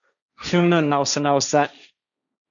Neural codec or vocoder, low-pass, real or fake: codec, 16 kHz, 1.1 kbps, Voila-Tokenizer; 7.2 kHz; fake